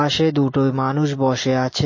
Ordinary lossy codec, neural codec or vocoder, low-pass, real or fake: MP3, 32 kbps; none; 7.2 kHz; real